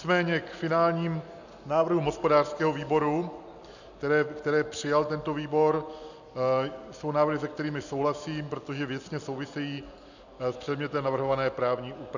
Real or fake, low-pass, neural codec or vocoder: real; 7.2 kHz; none